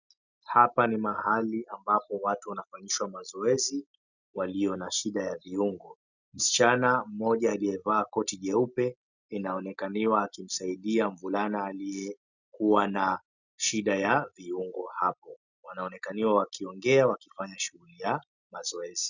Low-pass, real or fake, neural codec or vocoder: 7.2 kHz; real; none